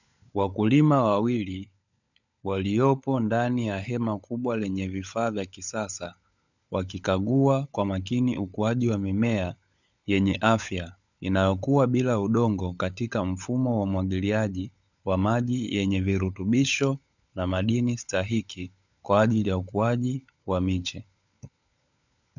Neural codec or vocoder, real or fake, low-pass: codec, 16 kHz, 16 kbps, FunCodec, trained on LibriTTS, 50 frames a second; fake; 7.2 kHz